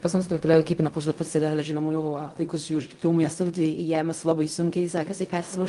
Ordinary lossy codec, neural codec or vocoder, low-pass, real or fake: Opus, 32 kbps; codec, 16 kHz in and 24 kHz out, 0.4 kbps, LongCat-Audio-Codec, fine tuned four codebook decoder; 10.8 kHz; fake